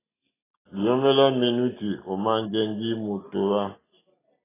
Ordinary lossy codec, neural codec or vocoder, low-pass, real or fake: AAC, 16 kbps; none; 3.6 kHz; real